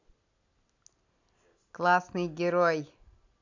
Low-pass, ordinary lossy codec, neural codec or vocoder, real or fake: 7.2 kHz; AAC, 48 kbps; none; real